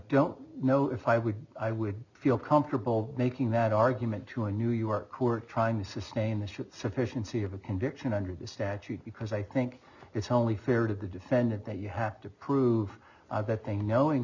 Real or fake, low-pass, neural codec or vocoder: real; 7.2 kHz; none